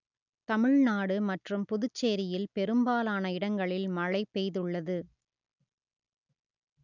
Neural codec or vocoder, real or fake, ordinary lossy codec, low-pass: none; real; none; 7.2 kHz